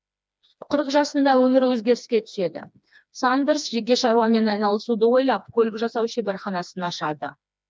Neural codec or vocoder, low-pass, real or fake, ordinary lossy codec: codec, 16 kHz, 2 kbps, FreqCodec, smaller model; none; fake; none